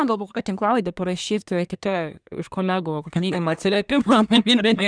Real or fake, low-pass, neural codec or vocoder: fake; 9.9 kHz; codec, 24 kHz, 1 kbps, SNAC